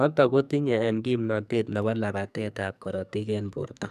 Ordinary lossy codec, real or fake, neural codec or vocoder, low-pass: none; fake; codec, 44.1 kHz, 2.6 kbps, SNAC; 14.4 kHz